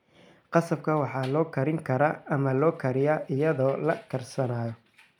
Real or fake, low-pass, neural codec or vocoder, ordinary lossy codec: real; 19.8 kHz; none; none